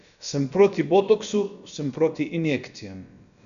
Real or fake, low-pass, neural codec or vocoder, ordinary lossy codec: fake; 7.2 kHz; codec, 16 kHz, about 1 kbps, DyCAST, with the encoder's durations; none